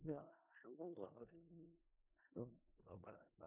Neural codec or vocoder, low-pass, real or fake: codec, 16 kHz in and 24 kHz out, 0.4 kbps, LongCat-Audio-Codec, four codebook decoder; 3.6 kHz; fake